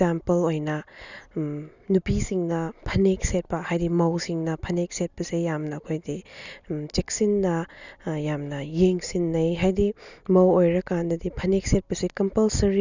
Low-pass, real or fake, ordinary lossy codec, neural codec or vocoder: 7.2 kHz; real; none; none